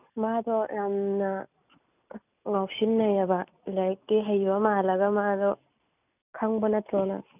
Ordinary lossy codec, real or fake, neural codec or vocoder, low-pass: none; real; none; 3.6 kHz